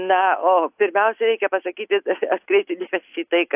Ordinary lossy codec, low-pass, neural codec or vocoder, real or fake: AAC, 32 kbps; 3.6 kHz; none; real